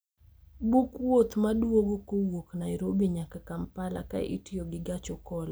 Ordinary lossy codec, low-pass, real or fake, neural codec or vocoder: none; none; real; none